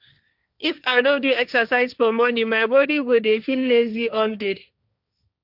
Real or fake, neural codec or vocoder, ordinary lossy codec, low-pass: fake; codec, 16 kHz, 1.1 kbps, Voila-Tokenizer; none; 5.4 kHz